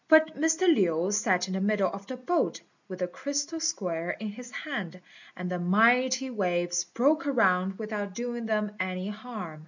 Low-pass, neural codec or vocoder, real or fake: 7.2 kHz; none; real